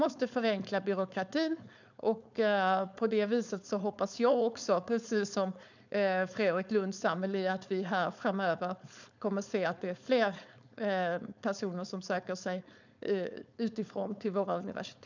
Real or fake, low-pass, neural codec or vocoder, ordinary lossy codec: fake; 7.2 kHz; codec, 16 kHz, 4.8 kbps, FACodec; none